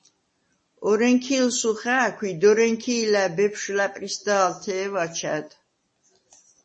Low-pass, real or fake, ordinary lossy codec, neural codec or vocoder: 10.8 kHz; real; MP3, 32 kbps; none